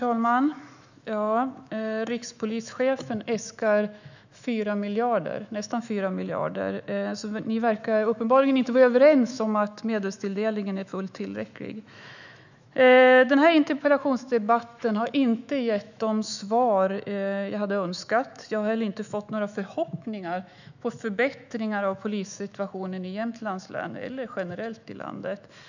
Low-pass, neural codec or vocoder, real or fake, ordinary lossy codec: 7.2 kHz; autoencoder, 48 kHz, 128 numbers a frame, DAC-VAE, trained on Japanese speech; fake; none